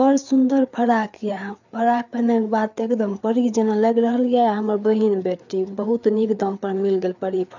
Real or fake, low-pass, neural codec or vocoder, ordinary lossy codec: fake; 7.2 kHz; codec, 16 kHz, 4 kbps, FreqCodec, larger model; none